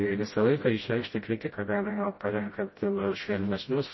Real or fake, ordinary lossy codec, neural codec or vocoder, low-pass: fake; MP3, 24 kbps; codec, 16 kHz, 0.5 kbps, FreqCodec, smaller model; 7.2 kHz